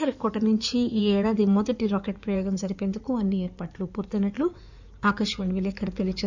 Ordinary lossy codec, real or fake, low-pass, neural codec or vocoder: none; fake; 7.2 kHz; codec, 16 kHz in and 24 kHz out, 2.2 kbps, FireRedTTS-2 codec